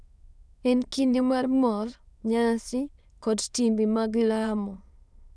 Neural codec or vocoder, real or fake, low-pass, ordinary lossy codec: autoencoder, 22.05 kHz, a latent of 192 numbers a frame, VITS, trained on many speakers; fake; none; none